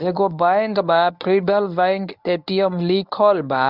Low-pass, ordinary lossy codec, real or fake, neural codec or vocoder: 5.4 kHz; none; fake; codec, 24 kHz, 0.9 kbps, WavTokenizer, medium speech release version 1